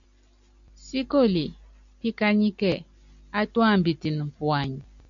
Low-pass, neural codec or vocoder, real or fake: 7.2 kHz; none; real